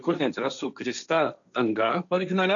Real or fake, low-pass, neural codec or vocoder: fake; 7.2 kHz; codec, 16 kHz, 1.1 kbps, Voila-Tokenizer